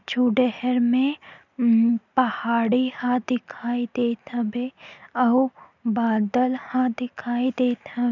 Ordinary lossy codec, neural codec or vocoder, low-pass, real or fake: none; none; 7.2 kHz; real